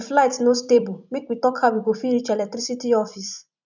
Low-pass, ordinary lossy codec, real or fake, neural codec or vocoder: 7.2 kHz; none; fake; vocoder, 44.1 kHz, 128 mel bands every 256 samples, BigVGAN v2